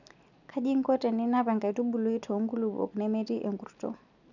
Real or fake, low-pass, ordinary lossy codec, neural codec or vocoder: real; 7.2 kHz; none; none